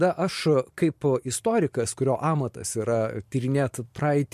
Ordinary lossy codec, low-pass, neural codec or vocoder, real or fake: MP3, 64 kbps; 14.4 kHz; vocoder, 44.1 kHz, 128 mel bands every 512 samples, BigVGAN v2; fake